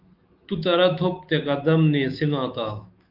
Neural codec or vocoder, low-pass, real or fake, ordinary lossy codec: autoencoder, 48 kHz, 128 numbers a frame, DAC-VAE, trained on Japanese speech; 5.4 kHz; fake; Opus, 24 kbps